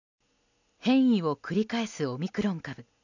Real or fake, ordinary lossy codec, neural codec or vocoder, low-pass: real; none; none; 7.2 kHz